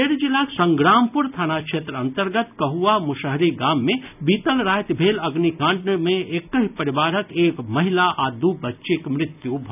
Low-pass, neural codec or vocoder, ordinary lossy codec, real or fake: 3.6 kHz; none; none; real